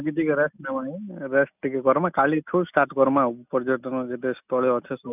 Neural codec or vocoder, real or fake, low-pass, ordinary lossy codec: none; real; 3.6 kHz; none